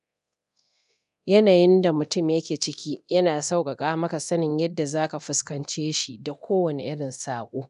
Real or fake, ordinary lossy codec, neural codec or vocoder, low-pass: fake; none; codec, 24 kHz, 0.9 kbps, DualCodec; 10.8 kHz